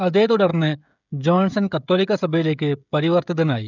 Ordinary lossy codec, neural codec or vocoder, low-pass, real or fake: none; codec, 16 kHz, 16 kbps, FreqCodec, smaller model; 7.2 kHz; fake